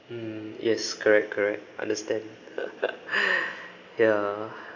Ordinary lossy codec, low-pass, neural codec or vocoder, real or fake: none; 7.2 kHz; none; real